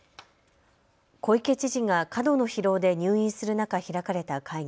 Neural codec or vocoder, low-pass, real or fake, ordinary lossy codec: none; none; real; none